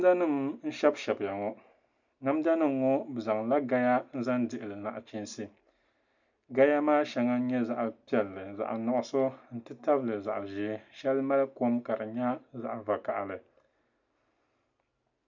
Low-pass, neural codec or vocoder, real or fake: 7.2 kHz; none; real